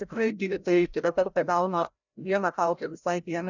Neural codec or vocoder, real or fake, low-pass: codec, 16 kHz, 0.5 kbps, FreqCodec, larger model; fake; 7.2 kHz